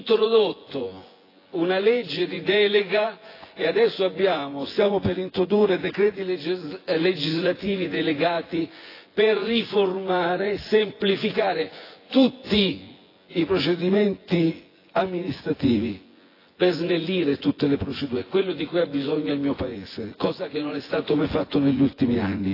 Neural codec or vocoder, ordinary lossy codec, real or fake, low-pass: vocoder, 24 kHz, 100 mel bands, Vocos; AAC, 24 kbps; fake; 5.4 kHz